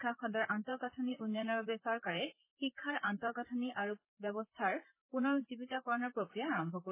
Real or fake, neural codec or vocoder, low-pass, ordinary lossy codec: fake; vocoder, 44.1 kHz, 128 mel bands, Pupu-Vocoder; 3.6 kHz; MP3, 16 kbps